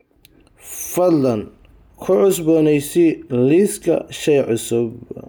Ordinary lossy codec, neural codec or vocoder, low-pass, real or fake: none; none; none; real